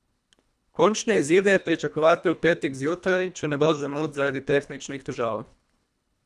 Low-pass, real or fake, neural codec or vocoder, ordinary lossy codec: none; fake; codec, 24 kHz, 1.5 kbps, HILCodec; none